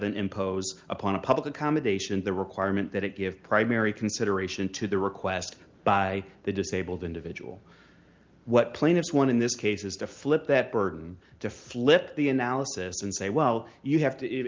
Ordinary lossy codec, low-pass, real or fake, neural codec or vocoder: Opus, 24 kbps; 7.2 kHz; real; none